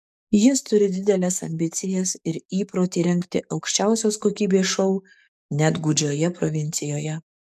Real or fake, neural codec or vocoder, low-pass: fake; codec, 44.1 kHz, 7.8 kbps, DAC; 14.4 kHz